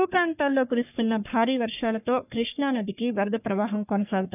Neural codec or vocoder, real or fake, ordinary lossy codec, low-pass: codec, 44.1 kHz, 3.4 kbps, Pupu-Codec; fake; none; 3.6 kHz